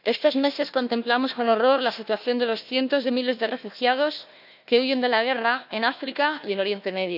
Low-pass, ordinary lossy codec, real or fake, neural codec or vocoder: 5.4 kHz; none; fake; codec, 16 kHz, 1 kbps, FunCodec, trained on Chinese and English, 50 frames a second